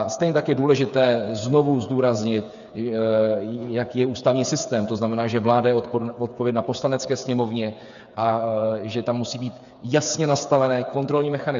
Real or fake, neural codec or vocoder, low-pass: fake; codec, 16 kHz, 8 kbps, FreqCodec, smaller model; 7.2 kHz